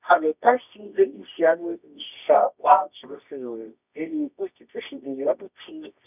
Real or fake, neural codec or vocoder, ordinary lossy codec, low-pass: fake; codec, 24 kHz, 0.9 kbps, WavTokenizer, medium music audio release; none; 3.6 kHz